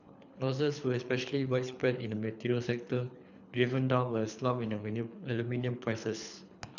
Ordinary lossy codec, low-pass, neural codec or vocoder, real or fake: none; 7.2 kHz; codec, 24 kHz, 3 kbps, HILCodec; fake